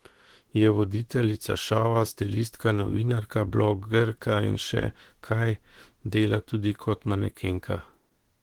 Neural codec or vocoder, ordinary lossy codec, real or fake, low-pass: autoencoder, 48 kHz, 32 numbers a frame, DAC-VAE, trained on Japanese speech; Opus, 16 kbps; fake; 19.8 kHz